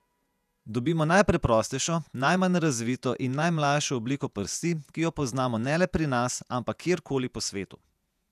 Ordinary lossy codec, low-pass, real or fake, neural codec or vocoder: none; 14.4 kHz; fake; vocoder, 48 kHz, 128 mel bands, Vocos